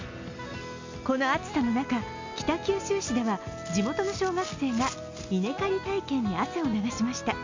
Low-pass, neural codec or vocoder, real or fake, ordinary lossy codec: 7.2 kHz; none; real; MP3, 64 kbps